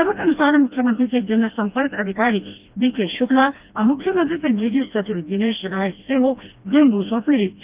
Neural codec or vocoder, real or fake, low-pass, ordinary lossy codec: codec, 16 kHz, 1 kbps, FreqCodec, smaller model; fake; 3.6 kHz; Opus, 24 kbps